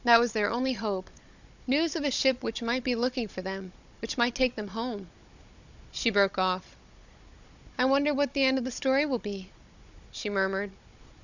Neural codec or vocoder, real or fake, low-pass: codec, 16 kHz, 16 kbps, FunCodec, trained on Chinese and English, 50 frames a second; fake; 7.2 kHz